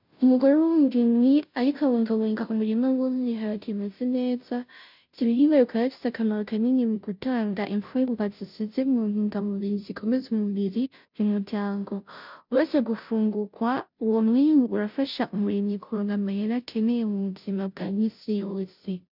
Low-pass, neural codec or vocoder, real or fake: 5.4 kHz; codec, 16 kHz, 0.5 kbps, FunCodec, trained on Chinese and English, 25 frames a second; fake